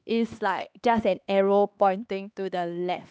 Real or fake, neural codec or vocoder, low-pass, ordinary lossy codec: fake; codec, 16 kHz, 2 kbps, X-Codec, HuBERT features, trained on LibriSpeech; none; none